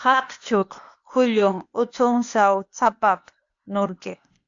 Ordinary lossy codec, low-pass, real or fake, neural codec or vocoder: AAC, 64 kbps; 7.2 kHz; fake; codec, 16 kHz, 0.8 kbps, ZipCodec